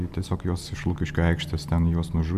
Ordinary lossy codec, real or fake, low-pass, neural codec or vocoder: MP3, 96 kbps; real; 14.4 kHz; none